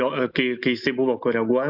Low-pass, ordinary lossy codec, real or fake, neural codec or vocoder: 5.4 kHz; AAC, 48 kbps; real; none